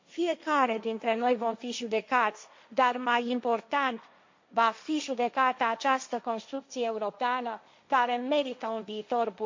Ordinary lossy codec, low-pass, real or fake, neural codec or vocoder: MP3, 48 kbps; 7.2 kHz; fake; codec, 16 kHz, 1.1 kbps, Voila-Tokenizer